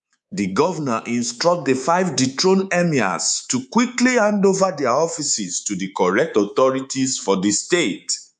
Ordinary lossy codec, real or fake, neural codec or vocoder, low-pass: none; fake; codec, 24 kHz, 3.1 kbps, DualCodec; 10.8 kHz